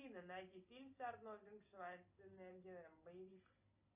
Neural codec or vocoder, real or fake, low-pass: none; real; 3.6 kHz